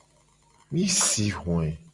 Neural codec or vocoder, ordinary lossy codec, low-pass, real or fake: none; Opus, 64 kbps; 10.8 kHz; real